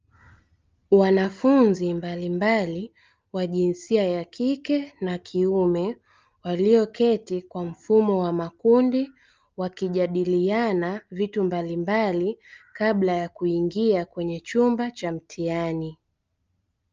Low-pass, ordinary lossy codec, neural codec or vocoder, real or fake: 7.2 kHz; Opus, 24 kbps; none; real